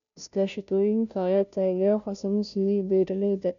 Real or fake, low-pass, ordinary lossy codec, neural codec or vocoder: fake; 7.2 kHz; MP3, 96 kbps; codec, 16 kHz, 0.5 kbps, FunCodec, trained on Chinese and English, 25 frames a second